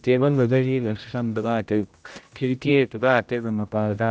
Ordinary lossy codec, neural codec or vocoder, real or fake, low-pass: none; codec, 16 kHz, 0.5 kbps, X-Codec, HuBERT features, trained on general audio; fake; none